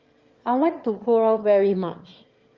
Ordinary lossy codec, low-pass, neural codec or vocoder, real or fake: Opus, 32 kbps; 7.2 kHz; autoencoder, 22.05 kHz, a latent of 192 numbers a frame, VITS, trained on one speaker; fake